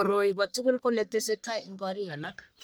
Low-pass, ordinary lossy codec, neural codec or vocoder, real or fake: none; none; codec, 44.1 kHz, 1.7 kbps, Pupu-Codec; fake